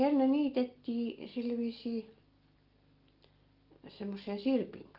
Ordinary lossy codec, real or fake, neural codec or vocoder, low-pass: Opus, 32 kbps; real; none; 5.4 kHz